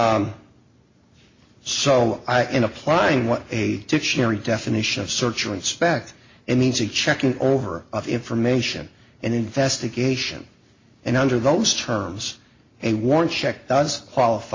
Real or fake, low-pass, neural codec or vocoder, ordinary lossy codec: real; 7.2 kHz; none; MP3, 32 kbps